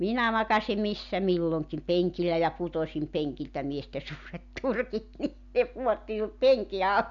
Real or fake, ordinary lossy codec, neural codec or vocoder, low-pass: real; none; none; 7.2 kHz